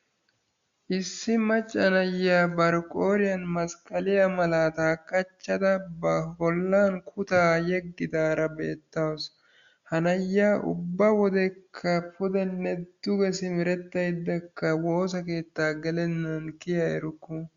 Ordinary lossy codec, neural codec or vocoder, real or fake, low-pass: Opus, 64 kbps; none; real; 7.2 kHz